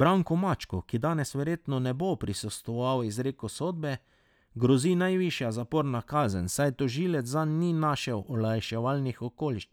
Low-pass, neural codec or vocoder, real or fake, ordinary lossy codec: 19.8 kHz; none; real; none